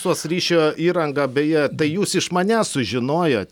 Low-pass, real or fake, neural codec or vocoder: 19.8 kHz; real; none